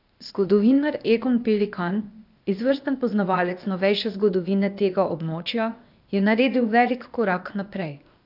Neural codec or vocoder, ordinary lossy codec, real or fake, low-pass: codec, 16 kHz, 0.8 kbps, ZipCodec; none; fake; 5.4 kHz